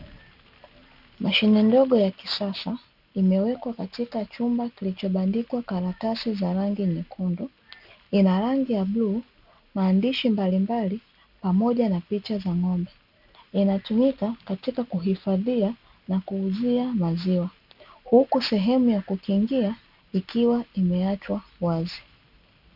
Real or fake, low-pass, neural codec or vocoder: real; 5.4 kHz; none